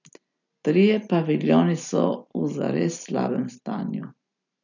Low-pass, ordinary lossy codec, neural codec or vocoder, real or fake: 7.2 kHz; none; none; real